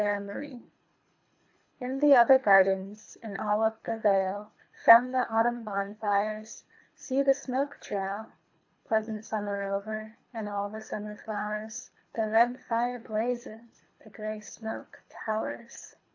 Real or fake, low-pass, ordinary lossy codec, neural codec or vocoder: fake; 7.2 kHz; AAC, 48 kbps; codec, 24 kHz, 3 kbps, HILCodec